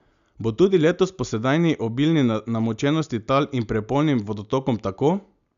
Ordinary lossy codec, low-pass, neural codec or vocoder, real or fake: none; 7.2 kHz; none; real